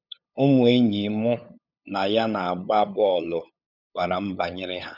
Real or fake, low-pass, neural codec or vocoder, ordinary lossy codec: fake; 5.4 kHz; codec, 16 kHz, 8 kbps, FunCodec, trained on LibriTTS, 25 frames a second; none